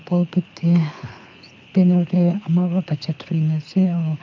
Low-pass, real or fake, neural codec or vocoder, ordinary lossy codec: 7.2 kHz; fake; codec, 24 kHz, 6 kbps, HILCodec; MP3, 64 kbps